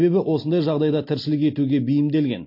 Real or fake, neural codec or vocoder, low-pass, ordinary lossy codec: real; none; 5.4 kHz; MP3, 32 kbps